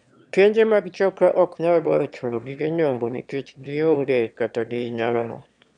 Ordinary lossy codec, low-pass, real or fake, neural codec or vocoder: none; 9.9 kHz; fake; autoencoder, 22.05 kHz, a latent of 192 numbers a frame, VITS, trained on one speaker